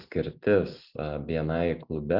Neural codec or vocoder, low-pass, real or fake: none; 5.4 kHz; real